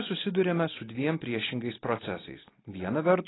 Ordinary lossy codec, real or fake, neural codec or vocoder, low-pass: AAC, 16 kbps; real; none; 7.2 kHz